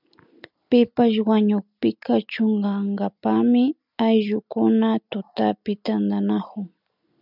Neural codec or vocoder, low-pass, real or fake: none; 5.4 kHz; real